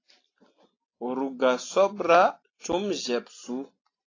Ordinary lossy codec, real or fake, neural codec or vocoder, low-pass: AAC, 32 kbps; real; none; 7.2 kHz